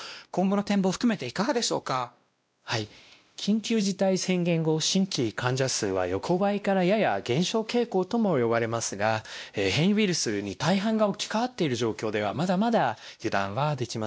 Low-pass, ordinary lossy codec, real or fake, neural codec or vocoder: none; none; fake; codec, 16 kHz, 1 kbps, X-Codec, WavLM features, trained on Multilingual LibriSpeech